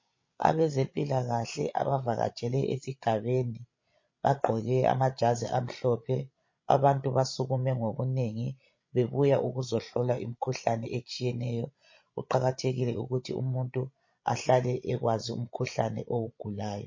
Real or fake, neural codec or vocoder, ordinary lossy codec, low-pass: fake; codec, 16 kHz, 16 kbps, FreqCodec, larger model; MP3, 32 kbps; 7.2 kHz